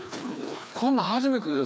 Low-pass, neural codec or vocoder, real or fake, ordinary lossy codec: none; codec, 16 kHz, 2 kbps, FreqCodec, larger model; fake; none